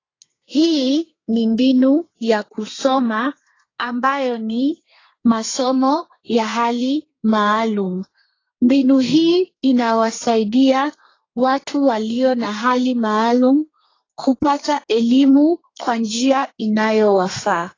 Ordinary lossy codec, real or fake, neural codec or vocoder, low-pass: AAC, 32 kbps; fake; codec, 32 kHz, 1.9 kbps, SNAC; 7.2 kHz